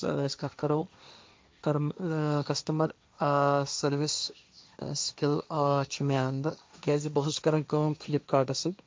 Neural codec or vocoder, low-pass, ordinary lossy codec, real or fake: codec, 16 kHz, 1.1 kbps, Voila-Tokenizer; none; none; fake